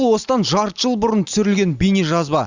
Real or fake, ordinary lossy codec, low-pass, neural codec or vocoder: real; Opus, 64 kbps; 7.2 kHz; none